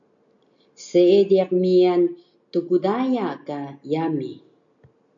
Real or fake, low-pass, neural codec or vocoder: real; 7.2 kHz; none